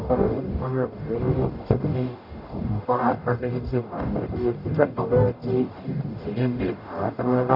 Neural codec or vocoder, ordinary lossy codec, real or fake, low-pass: codec, 44.1 kHz, 0.9 kbps, DAC; none; fake; 5.4 kHz